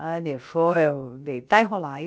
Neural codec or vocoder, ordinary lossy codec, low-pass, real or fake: codec, 16 kHz, 0.7 kbps, FocalCodec; none; none; fake